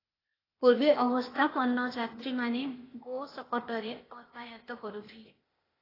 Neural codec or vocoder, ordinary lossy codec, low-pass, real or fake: codec, 16 kHz, 0.8 kbps, ZipCodec; AAC, 24 kbps; 5.4 kHz; fake